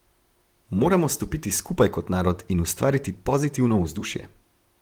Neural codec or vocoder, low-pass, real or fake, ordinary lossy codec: vocoder, 44.1 kHz, 128 mel bands, Pupu-Vocoder; 19.8 kHz; fake; Opus, 24 kbps